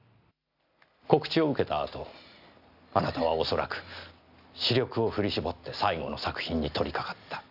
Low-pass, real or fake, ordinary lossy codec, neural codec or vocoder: 5.4 kHz; real; none; none